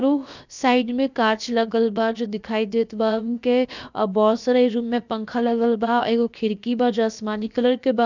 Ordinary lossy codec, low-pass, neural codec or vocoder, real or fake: none; 7.2 kHz; codec, 16 kHz, about 1 kbps, DyCAST, with the encoder's durations; fake